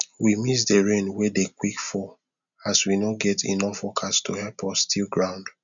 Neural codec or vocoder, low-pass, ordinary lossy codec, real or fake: none; 7.2 kHz; MP3, 96 kbps; real